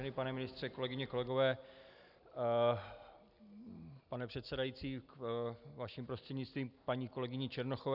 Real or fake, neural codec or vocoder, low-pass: real; none; 5.4 kHz